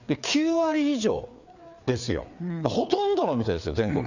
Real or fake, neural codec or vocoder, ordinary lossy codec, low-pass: fake; codec, 16 kHz, 4 kbps, FreqCodec, larger model; none; 7.2 kHz